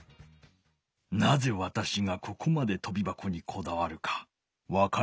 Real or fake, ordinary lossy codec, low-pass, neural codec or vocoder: real; none; none; none